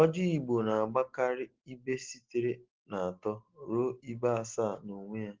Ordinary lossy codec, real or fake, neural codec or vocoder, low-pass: Opus, 16 kbps; real; none; 7.2 kHz